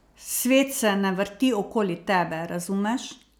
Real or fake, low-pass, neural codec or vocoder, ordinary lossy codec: real; none; none; none